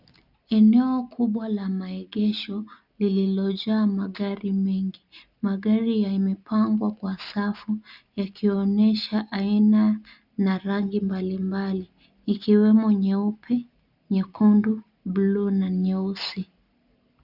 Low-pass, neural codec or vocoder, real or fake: 5.4 kHz; none; real